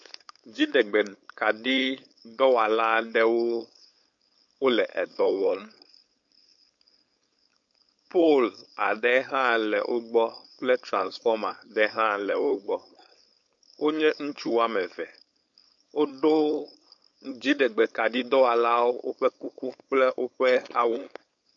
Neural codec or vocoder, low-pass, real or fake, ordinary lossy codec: codec, 16 kHz, 4.8 kbps, FACodec; 7.2 kHz; fake; MP3, 48 kbps